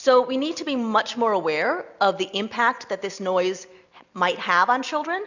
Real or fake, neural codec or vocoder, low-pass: real; none; 7.2 kHz